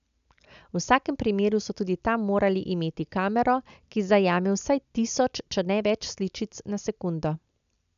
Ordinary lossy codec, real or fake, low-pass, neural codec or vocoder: none; real; 7.2 kHz; none